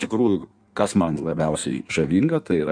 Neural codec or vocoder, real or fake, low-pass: codec, 16 kHz in and 24 kHz out, 1.1 kbps, FireRedTTS-2 codec; fake; 9.9 kHz